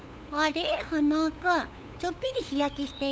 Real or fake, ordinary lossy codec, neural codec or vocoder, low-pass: fake; none; codec, 16 kHz, 8 kbps, FunCodec, trained on LibriTTS, 25 frames a second; none